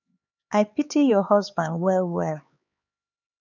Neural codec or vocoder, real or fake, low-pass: codec, 16 kHz, 4 kbps, X-Codec, HuBERT features, trained on LibriSpeech; fake; 7.2 kHz